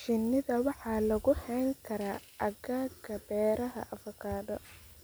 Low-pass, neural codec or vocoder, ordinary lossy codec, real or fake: none; none; none; real